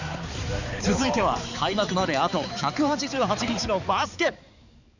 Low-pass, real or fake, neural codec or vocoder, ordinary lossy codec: 7.2 kHz; fake; codec, 16 kHz, 4 kbps, X-Codec, HuBERT features, trained on balanced general audio; none